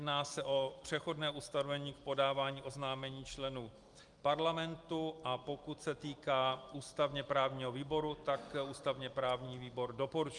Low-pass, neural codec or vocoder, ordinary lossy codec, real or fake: 10.8 kHz; none; Opus, 32 kbps; real